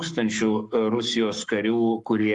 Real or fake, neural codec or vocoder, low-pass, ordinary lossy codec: fake; codec, 44.1 kHz, 7.8 kbps, Pupu-Codec; 10.8 kHz; Opus, 32 kbps